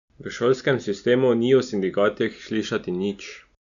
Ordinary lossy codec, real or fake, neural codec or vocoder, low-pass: none; real; none; 7.2 kHz